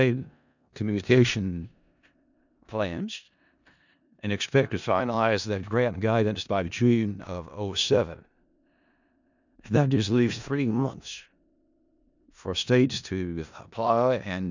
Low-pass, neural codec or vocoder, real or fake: 7.2 kHz; codec, 16 kHz in and 24 kHz out, 0.4 kbps, LongCat-Audio-Codec, four codebook decoder; fake